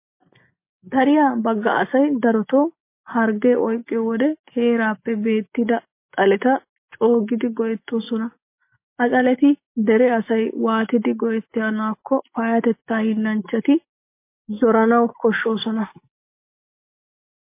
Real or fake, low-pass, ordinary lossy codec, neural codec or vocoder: real; 3.6 kHz; MP3, 24 kbps; none